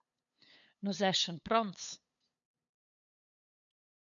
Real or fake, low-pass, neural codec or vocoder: fake; 7.2 kHz; codec, 16 kHz, 8 kbps, FunCodec, trained on LibriTTS, 25 frames a second